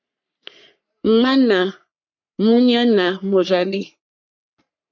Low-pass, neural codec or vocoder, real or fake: 7.2 kHz; codec, 44.1 kHz, 3.4 kbps, Pupu-Codec; fake